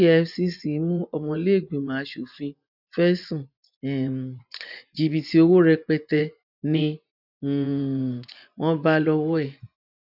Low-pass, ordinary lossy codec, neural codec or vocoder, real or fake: 5.4 kHz; none; vocoder, 24 kHz, 100 mel bands, Vocos; fake